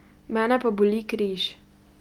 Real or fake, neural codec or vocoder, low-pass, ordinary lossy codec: real; none; 19.8 kHz; Opus, 32 kbps